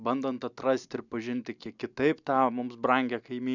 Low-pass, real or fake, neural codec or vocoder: 7.2 kHz; real; none